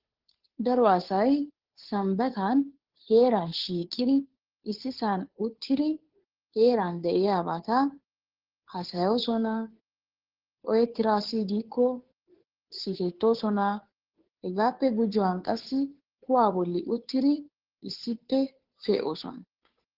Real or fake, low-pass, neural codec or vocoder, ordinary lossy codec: fake; 5.4 kHz; codec, 16 kHz, 2 kbps, FunCodec, trained on Chinese and English, 25 frames a second; Opus, 16 kbps